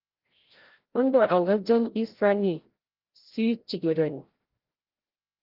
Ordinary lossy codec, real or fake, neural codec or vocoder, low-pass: Opus, 16 kbps; fake; codec, 16 kHz, 0.5 kbps, FreqCodec, larger model; 5.4 kHz